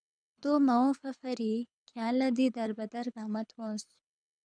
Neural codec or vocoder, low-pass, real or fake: codec, 24 kHz, 6 kbps, HILCodec; 9.9 kHz; fake